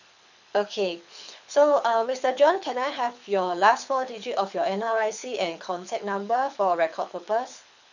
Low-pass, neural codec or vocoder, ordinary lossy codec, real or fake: 7.2 kHz; codec, 24 kHz, 6 kbps, HILCodec; none; fake